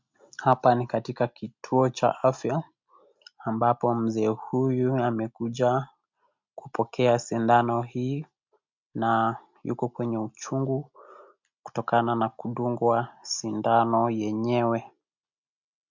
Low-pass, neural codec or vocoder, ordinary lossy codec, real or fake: 7.2 kHz; none; MP3, 64 kbps; real